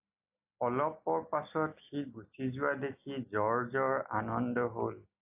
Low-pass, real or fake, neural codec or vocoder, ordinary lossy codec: 3.6 kHz; real; none; AAC, 32 kbps